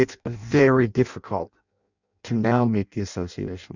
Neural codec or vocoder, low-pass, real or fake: codec, 16 kHz in and 24 kHz out, 0.6 kbps, FireRedTTS-2 codec; 7.2 kHz; fake